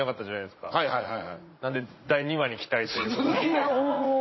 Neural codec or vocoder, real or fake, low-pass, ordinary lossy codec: vocoder, 44.1 kHz, 80 mel bands, Vocos; fake; 7.2 kHz; MP3, 24 kbps